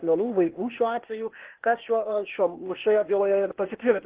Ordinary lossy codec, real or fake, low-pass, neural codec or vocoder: Opus, 16 kbps; fake; 3.6 kHz; codec, 16 kHz, 0.8 kbps, ZipCodec